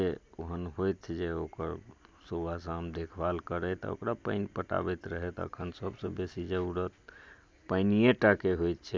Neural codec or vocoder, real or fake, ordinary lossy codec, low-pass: none; real; none; none